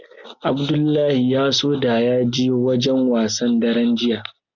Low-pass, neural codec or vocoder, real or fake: 7.2 kHz; none; real